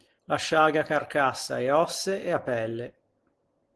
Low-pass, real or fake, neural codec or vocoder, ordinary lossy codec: 10.8 kHz; real; none; Opus, 16 kbps